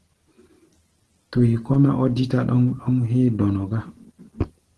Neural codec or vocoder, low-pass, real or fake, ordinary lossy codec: none; 10.8 kHz; real; Opus, 16 kbps